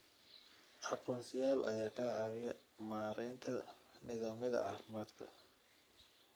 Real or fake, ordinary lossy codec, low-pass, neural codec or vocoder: fake; none; none; codec, 44.1 kHz, 3.4 kbps, Pupu-Codec